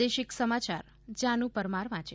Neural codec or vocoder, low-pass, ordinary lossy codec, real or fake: none; none; none; real